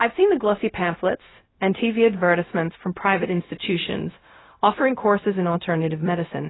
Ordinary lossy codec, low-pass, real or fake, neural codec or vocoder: AAC, 16 kbps; 7.2 kHz; fake; codec, 16 kHz, 0.4 kbps, LongCat-Audio-Codec